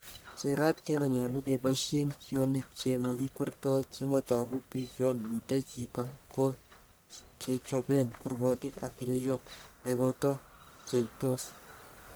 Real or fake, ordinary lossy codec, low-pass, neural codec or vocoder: fake; none; none; codec, 44.1 kHz, 1.7 kbps, Pupu-Codec